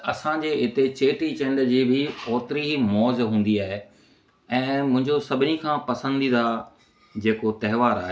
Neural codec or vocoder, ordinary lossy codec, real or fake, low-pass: none; none; real; none